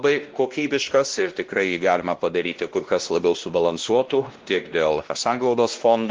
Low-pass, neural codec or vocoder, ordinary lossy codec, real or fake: 7.2 kHz; codec, 16 kHz, 1 kbps, X-Codec, WavLM features, trained on Multilingual LibriSpeech; Opus, 16 kbps; fake